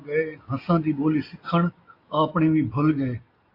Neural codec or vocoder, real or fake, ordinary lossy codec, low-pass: none; real; AAC, 32 kbps; 5.4 kHz